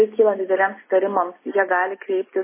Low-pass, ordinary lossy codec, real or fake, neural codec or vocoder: 3.6 kHz; MP3, 16 kbps; real; none